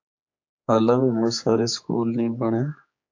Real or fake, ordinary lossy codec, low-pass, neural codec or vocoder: fake; AAC, 48 kbps; 7.2 kHz; codec, 16 kHz, 4 kbps, X-Codec, HuBERT features, trained on general audio